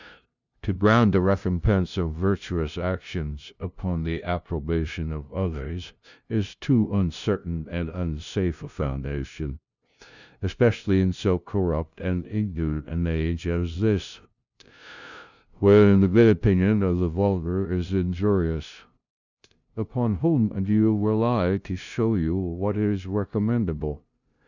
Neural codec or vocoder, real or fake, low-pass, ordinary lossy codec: codec, 16 kHz, 0.5 kbps, FunCodec, trained on LibriTTS, 25 frames a second; fake; 7.2 kHz; Opus, 64 kbps